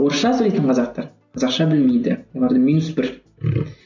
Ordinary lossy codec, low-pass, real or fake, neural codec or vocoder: none; 7.2 kHz; real; none